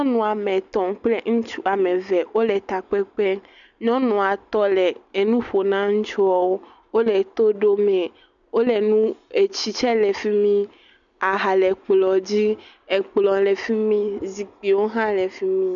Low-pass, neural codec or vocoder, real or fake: 7.2 kHz; none; real